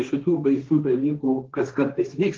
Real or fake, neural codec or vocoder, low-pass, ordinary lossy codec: fake; codec, 16 kHz, 1.1 kbps, Voila-Tokenizer; 7.2 kHz; Opus, 16 kbps